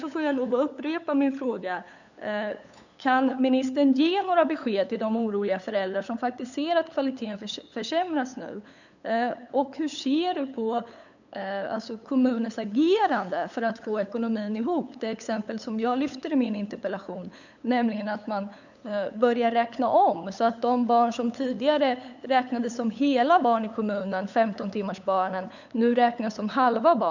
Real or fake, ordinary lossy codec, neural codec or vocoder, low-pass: fake; none; codec, 16 kHz, 8 kbps, FunCodec, trained on LibriTTS, 25 frames a second; 7.2 kHz